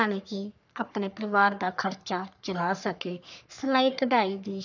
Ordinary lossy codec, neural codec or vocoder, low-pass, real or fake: none; codec, 44.1 kHz, 3.4 kbps, Pupu-Codec; 7.2 kHz; fake